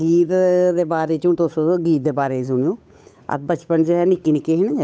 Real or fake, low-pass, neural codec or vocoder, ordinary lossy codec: fake; none; codec, 16 kHz, 8 kbps, FunCodec, trained on Chinese and English, 25 frames a second; none